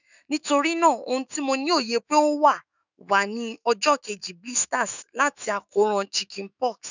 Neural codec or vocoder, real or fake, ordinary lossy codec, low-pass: autoencoder, 48 kHz, 128 numbers a frame, DAC-VAE, trained on Japanese speech; fake; none; 7.2 kHz